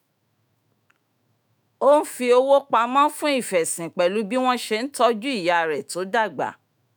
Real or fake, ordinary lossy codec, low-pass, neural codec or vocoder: fake; none; none; autoencoder, 48 kHz, 128 numbers a frame, DAC-VAE, trained on Japanese speech